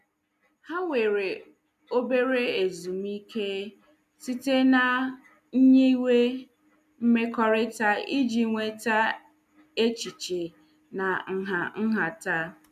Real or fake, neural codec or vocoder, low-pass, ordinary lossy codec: real; none; 14.4 kHz; none